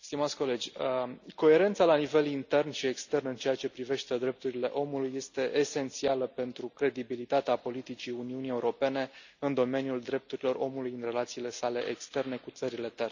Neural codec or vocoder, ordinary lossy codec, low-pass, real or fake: none; none; 7.2 kHz; real